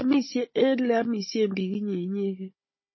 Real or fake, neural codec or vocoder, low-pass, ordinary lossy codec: fake; codec, 16 kHz, 16 kbps, FunCodec, trained on Chinese and English, 50 frames a second; 7.2 kHz; MP3, 24 kbps